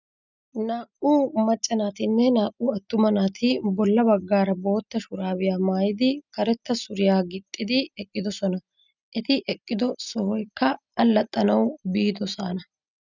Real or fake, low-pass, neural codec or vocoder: real; 7.2 kHz; none